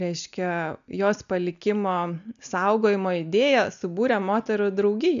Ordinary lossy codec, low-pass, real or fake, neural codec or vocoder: AAC, 96 kbps; 7.2 kHz; real; none